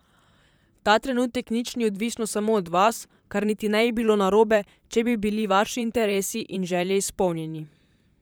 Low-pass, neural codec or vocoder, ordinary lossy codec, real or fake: none; vocoder, 44.1 kHz, 128 mel bands, Pupu-Vocoder; none; fake